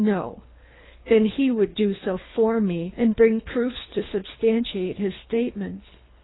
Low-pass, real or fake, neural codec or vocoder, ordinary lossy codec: 7.2 kHz; fake; codec, 24 kHz, 3 kbps, HILCodec; AAC, 16 kbps